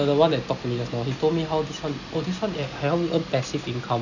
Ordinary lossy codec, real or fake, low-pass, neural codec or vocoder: none; real; 7.2 kHz; none